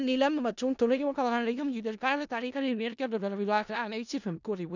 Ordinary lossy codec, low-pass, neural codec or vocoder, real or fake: none; 7.2 kHz; codec, 16 kHz in and 24 kHz out, 0.4 kbps, LongCat-Audio-Codec, four codebook decoder; fake